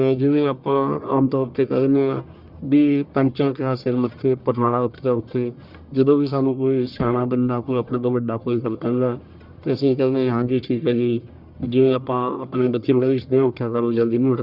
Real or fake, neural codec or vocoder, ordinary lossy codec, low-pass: fake; codec, 44.1 kHz, 1.7 kbps, Pupu-Codec; Opus, 64 kbps; 5.4 kHz